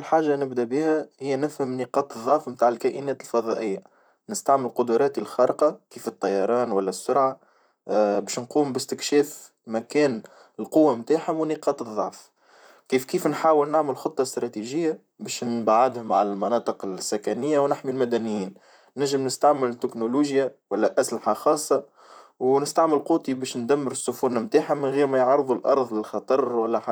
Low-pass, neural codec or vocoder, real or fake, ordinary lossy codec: none; vocoder, 44.1 kHz, 128 mel bands, Pupu-Vocoder; fake; none